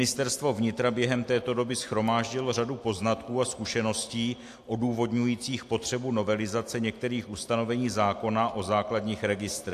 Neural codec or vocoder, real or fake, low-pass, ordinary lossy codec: none; real; 14.4 kHz; AAC, 64 kbps